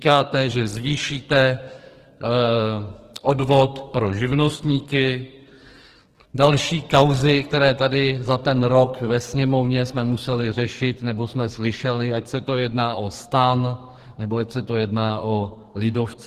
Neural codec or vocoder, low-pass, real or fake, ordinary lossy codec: codec, 44.1 kHz, 2.6 kbps, SNAC; 14.4 kHz; fake; Opus, 16 kbps